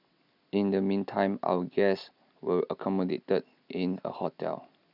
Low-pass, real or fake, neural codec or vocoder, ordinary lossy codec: 5.4 kHz; real; none; none